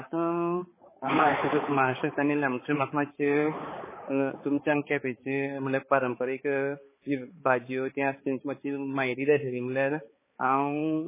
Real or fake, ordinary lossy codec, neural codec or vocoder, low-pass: fake; MP3, 16 kbps; codec, 16 kHz, 4 kbps, X-Codec, HuBERT features, trained on balanced general audio; 3.6 kHz